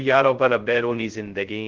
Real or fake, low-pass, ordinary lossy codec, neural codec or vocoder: fake; 7.2 kHz; Opus, 16 kbps; codec, 16 kHz, 0.2 kbps, FocalCodec